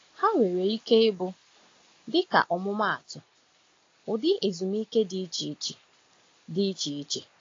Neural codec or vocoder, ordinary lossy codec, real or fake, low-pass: none; AAC, 32 kbps; real; 7.2 kHz